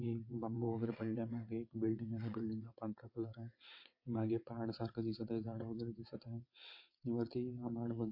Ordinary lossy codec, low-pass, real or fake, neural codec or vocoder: MP3, 32 kbps; 5.4 kHz; fake; vocoder, 22.05 kHz, 80 mel bands, WaveNeXt